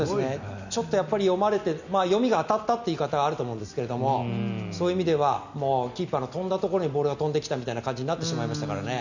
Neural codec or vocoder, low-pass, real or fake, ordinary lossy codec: none; 7.2 kHz; real; none